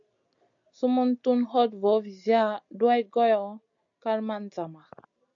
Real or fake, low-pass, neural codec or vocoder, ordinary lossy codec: real; 7.2 kHz; none; MP3, 64 kbps